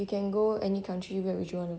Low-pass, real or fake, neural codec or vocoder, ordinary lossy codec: none; real; none; none